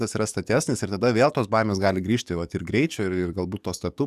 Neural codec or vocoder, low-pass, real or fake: codec, 44.1 kHz, 7.8 kbps, DAC; 14.4 kHz; fake